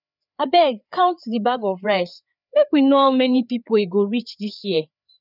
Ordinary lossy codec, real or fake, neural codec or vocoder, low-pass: none; fake; codec, 16 kHz, 4 kbps, FreqCodec, larger model; 5.4 kHz